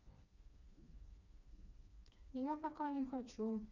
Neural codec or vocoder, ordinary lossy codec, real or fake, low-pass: codec, 16 kHz, 2 kbps, FreqCodec, smaller model; none; fake; 7.2 kHz